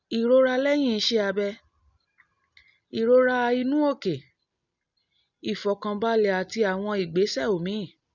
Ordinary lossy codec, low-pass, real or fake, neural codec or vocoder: none; 7.2 kHz; real; none